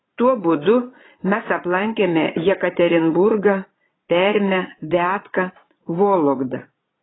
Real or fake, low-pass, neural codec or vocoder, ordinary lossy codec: fake; 7.2 kHz; vocoder, 22.05 kHz, 80 mel bands, Vocos; AAC, 16 kbps